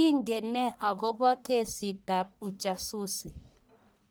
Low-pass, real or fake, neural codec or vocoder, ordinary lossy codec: none; fake; codec, 44.1 kHz, 1.7 kbps, Pupu-Codec; none